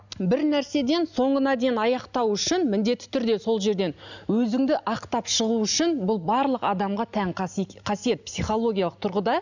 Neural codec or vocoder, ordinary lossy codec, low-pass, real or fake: none; none; 7.2 kHz; real